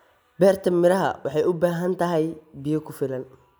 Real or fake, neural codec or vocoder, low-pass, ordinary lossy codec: real; none; none; none